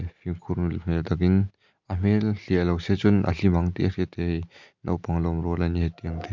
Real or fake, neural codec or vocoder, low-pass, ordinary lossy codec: real; none; 7.2 kHz; MP3, 64 kbps